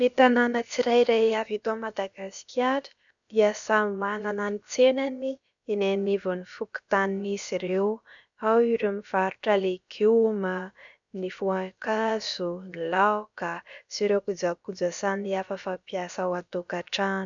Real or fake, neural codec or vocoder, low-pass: fake; codec, 16 kHz, about 1 kbps, DyCAST, with the encoder's durations; 7.2 kHz